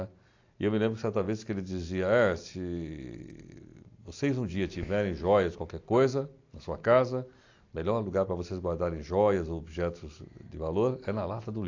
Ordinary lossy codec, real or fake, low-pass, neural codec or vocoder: AAC, 48 kbps; real; 7.2 kHz; none